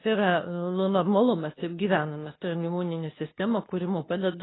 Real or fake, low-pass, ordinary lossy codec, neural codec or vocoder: fake; 7.2 kHz; AAC, 16 kbps; codec, 24 kHz, 0.9 kbps, WavTokenizer, medium speech release version 2